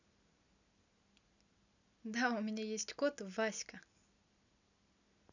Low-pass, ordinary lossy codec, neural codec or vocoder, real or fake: 7.2 kHz; none; none; real